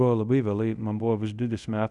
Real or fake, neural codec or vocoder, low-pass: fake; codec, 24 kHz, 0.5 kbps, DualCodec; 10.8 kHz